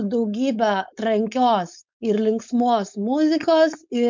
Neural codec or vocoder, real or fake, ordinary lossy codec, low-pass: codec, 16 kHz, 4.8 kbps, FACodec; fake; MP3, 64 kbps; 7.2 kHz